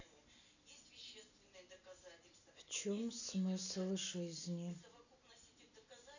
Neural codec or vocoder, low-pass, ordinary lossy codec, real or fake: none; 7.2 kHz; none; real